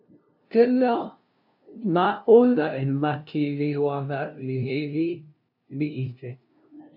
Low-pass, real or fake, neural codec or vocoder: 5.4 kHz; fake; codec, 16 kHz, 0.5 kbps, FunCodec, trained on LibriTTS, 25 frames a second